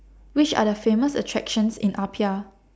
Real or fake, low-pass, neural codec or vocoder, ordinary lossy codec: real; none; none; none